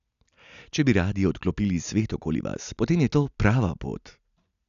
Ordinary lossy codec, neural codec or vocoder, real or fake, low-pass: none; none; real; 7.2 kHz